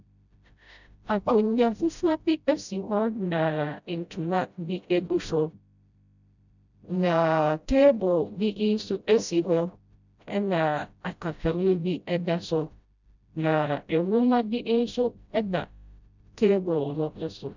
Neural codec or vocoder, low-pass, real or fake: codec, 16 kHz, 0.5 kbps, FreqCodec, smaller model; 7.2 kHz; fake